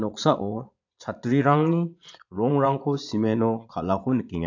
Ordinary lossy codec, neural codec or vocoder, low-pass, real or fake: AAC, 48 kbps; vocoder, 22.05 kHz, 80 mel bands, Vocos; 7.2 kHz; fake